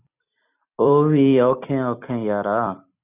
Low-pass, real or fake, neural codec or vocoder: 3.6 kHz; fake; vocoder, 44.1 kHz, 128 mel bands every 256 samples, BigVGAN v2